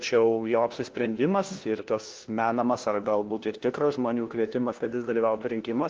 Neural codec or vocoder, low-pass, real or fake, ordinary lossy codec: codec, 16 kHz, 1 kbps, FunCodec, trained on LibriTTS, 50 frames a second; 7.2 kHz; fake; Opus, 16 kbps